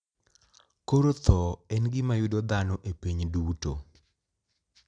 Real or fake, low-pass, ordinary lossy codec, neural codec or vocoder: real; 9.9 kHz; none; none